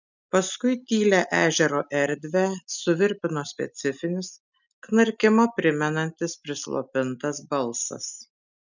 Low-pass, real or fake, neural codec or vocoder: 7.2 kHz; real; none